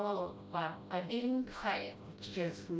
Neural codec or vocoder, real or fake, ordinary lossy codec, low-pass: codec, 16 kHz, 0.5 kbps, FreqCodec, smaller model; fake; none; none